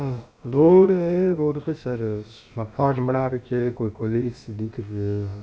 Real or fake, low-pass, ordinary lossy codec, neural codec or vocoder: fake; none; none; codec, 16 kHz, about 1 kbps, DyCAST, with the encoder's durations